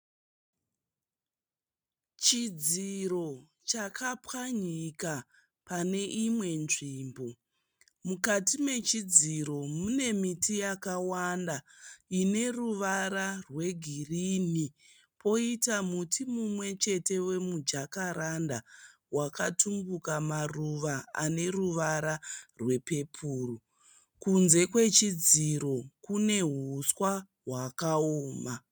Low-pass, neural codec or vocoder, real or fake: 19.8 kHz; none; real